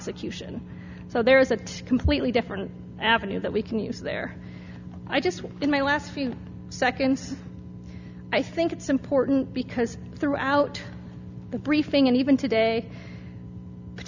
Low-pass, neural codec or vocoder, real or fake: 7.2 kHz; none; real